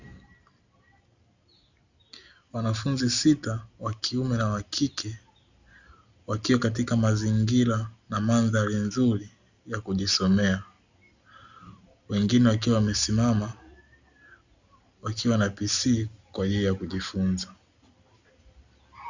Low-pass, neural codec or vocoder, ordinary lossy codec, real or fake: 7.2 kHz; none; Opus, 64 kbps; real